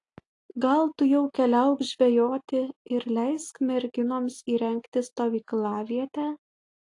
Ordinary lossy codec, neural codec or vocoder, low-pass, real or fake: AAC, 48 kbps; none; 10.8 kHz; real